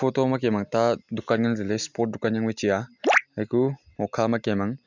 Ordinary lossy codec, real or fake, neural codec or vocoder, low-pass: none; real; none; 7.2 kHz